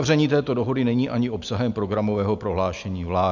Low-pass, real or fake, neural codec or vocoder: 7.2 kHz; real; none